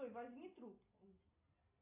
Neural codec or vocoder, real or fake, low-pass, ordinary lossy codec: none; real; 3.6 kHz; AAC, 32 kbps